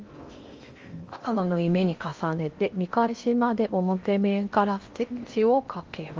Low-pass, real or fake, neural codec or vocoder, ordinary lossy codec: 7.2 kHz; fake; codec, 16 kHz in and 24 kHz out, 0.8 kbps, FocalCodec, streaming, 65536 codes; Opus, 32 kbps